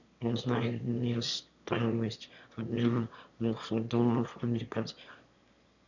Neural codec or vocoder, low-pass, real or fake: autoencoder, 22.05 kHz, a latent of 192 numbers a frame, VITS, trained on one speaker; 7.2 kHz; fake